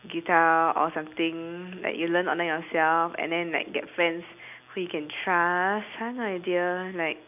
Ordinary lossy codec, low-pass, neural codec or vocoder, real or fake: none; 3.6 kHz; none; real